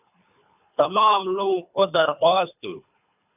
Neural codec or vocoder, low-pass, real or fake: codec, 24 kHz, 3 kbps, HILCodec; 3.6 kHz; fake